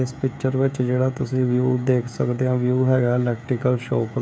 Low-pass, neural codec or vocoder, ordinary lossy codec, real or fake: none; codec, 16 kHz, 16 kbps, FreqCodec, smaller model; none; fake